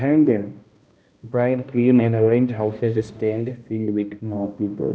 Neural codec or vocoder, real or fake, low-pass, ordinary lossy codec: codec, 16 kHz, 1 kbps, X-Codec, HuBERT features, trained on balanced general audio; fake; none; none